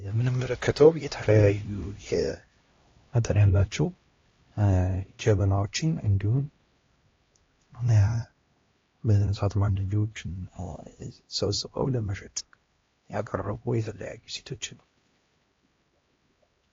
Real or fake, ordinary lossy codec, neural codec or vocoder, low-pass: fake; AAC, 32 kbps; codec, 16 kHz, 1 kbps, X-Codec, HuBERT features, trained on LibriSpeech; 7.2 kHz